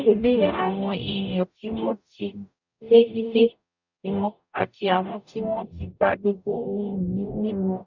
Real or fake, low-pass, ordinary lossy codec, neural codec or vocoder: fake; 7.2 kHz; none; codec, 44.1 kHz, 0.9 kbps, DAC